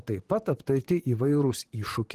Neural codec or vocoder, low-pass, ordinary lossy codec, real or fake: vocoder, 44.1 kHz, 128 mel bands, Pupu-Vocoder; 14.4 kHz; Opus, 16 kbps; fake